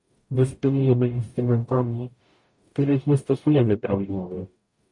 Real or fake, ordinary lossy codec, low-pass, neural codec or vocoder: fake; MP3, 48 kbps; 10.8 kHz; codec, 44.1 kHz, 0.9 kbps, DAC